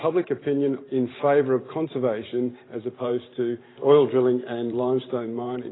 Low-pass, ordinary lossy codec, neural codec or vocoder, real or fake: 7.2 kHz; AAC, 16 kbps; vocoder, 44.1 kHz, 128 mel bands every 512 samples, BigVGAN v2; fake